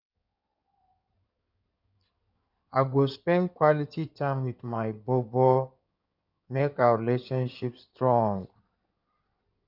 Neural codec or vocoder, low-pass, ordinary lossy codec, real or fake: codec, 16 kHz in and 24 kHz out, 2.2 kbps, FireRedTTS-2 codec; 5.4 kHz; none; fake